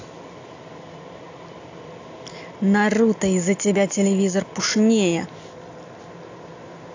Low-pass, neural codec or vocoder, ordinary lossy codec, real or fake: 7.2 kHz; none; AAC, 48 kbps; real